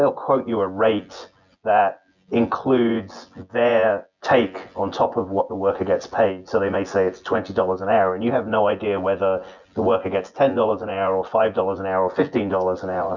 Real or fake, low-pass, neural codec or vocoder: fake; 7.2 kHz; vocoder, 24 kHz, 100 mel bands, Vocos